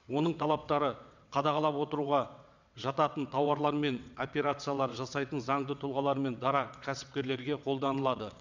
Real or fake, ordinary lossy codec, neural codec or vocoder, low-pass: fake; none; vocoder, 22.05 kHz, 80 mel bands, WaveNeXt; 7.2 kHz